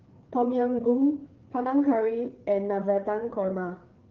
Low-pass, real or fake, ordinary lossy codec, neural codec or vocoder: 7.2 kHz; fake; Opus, 16 kbps; codec, 16 kHz, 4 kbps, FreqCodec, larger model